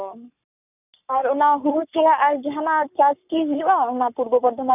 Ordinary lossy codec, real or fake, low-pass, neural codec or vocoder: none; fake; 3.6 kHz; codec, 44.1 kHz, 7.8 kbps, Pupu-Codec